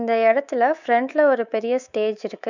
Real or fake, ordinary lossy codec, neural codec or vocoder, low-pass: fake; none; codec, 24 kHz, 3.1 kbps, DualCodec; 7.2 kHz